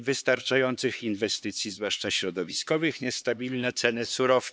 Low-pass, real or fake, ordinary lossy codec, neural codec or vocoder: none; fake; none; codec, 16 kHz, 4 kbps, X-Codec, HuBERT features, trained on LibriSpeech